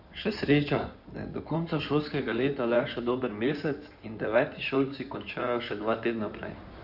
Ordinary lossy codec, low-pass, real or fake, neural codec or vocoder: none; 5.4 kHz; fake; codec, 16 kHz in and 24 kHz out, 2.2 kbps, FireRedTTS-2 codec